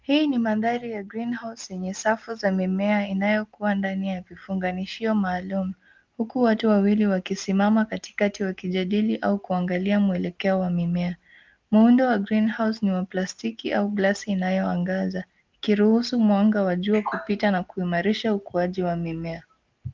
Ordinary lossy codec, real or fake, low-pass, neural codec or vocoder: Opus, 32 kbps; real; 7.2 kHz; none